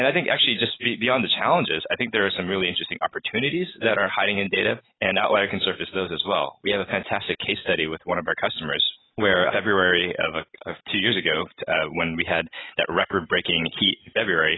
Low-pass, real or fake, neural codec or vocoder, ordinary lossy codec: 7.2 kHz; real; none; AAC, 16 kbps